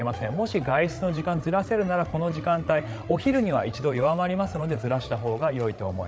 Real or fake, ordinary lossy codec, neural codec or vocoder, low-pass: fake; none; codec, 16 kHz, 8 kbps, FreqCodec, larger model; none